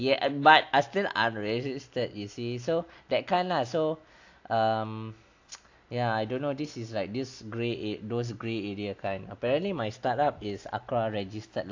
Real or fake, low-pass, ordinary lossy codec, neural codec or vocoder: real; 7.2 kHz; AAC, 48 kbps; none